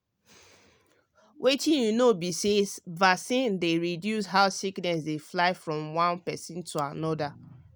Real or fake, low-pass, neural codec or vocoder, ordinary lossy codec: real; none; none; none